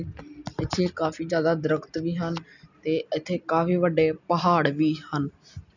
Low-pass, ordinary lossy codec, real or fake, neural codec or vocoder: 7.2 kHz; none; real; none